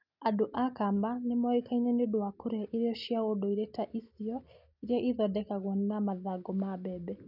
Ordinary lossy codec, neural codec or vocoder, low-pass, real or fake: none; none; 5.4 kHz; real